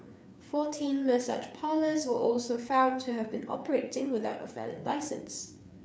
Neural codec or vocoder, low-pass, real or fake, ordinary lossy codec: codec, 16 kHz, 4 kbps, FreqCodec, larger model; none; fake; none